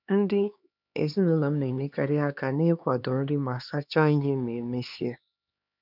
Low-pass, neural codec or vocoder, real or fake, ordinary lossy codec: 5.4 kHz; codec, 16 kHz, 2 kbps, X-Codec, HuBERT features, trained on LibriSpeech; fake; none